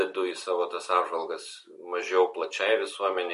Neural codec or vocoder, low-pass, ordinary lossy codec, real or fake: none; 14.4 kHz; MP3, 48 kbps; real